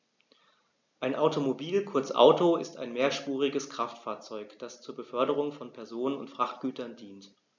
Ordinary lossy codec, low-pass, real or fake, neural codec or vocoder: none; 7.2 kHz; real; none